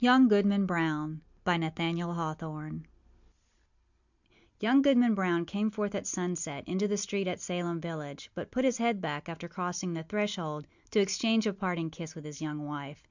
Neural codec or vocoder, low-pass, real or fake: none; 7.2 kHz; real